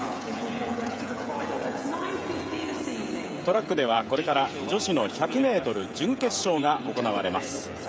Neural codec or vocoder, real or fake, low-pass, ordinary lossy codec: codec, 16 kHz, 16 kbps, FreqCodec, smaller model; fake; none; none